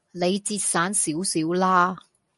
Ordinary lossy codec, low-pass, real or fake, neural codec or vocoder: MP3, 48 kbps; 14.4 kHz; real; none